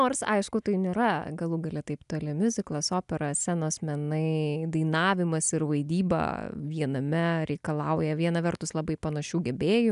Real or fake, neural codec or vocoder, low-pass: real; none; 10.8 kHz